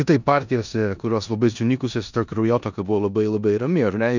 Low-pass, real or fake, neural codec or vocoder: 7.2 kHz; fake; codec, 16 kHz in and 24 kHz out, 0.9 kbps, LongCat-Audio-Codec, four codebook decoder